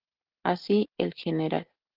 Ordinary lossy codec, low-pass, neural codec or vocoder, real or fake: Opus, 16 kbps; 5.4 kHz; none; real